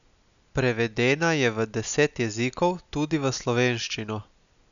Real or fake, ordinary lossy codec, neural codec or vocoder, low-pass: real; none; none; 7.2 kHz